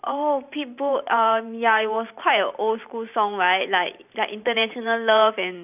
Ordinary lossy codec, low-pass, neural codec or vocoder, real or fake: none; 3.6 kHz; vocoder, 44.1 kHz, 128 mel bands every 512 samples, BigVGAN v2; fake